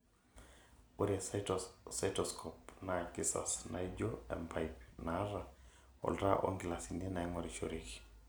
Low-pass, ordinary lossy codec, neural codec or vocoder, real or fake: none; none; none; real